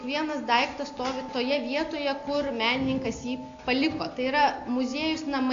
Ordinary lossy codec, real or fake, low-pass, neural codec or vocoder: AAC, 48 kbps; real; 7.2 kHz; none